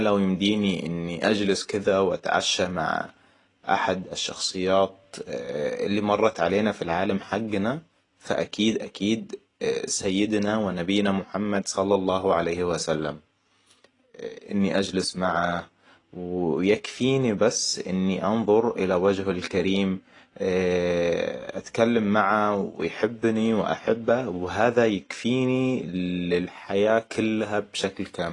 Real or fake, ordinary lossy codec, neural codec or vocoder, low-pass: real; AAC, 32 kbps; none; 10.8 kHz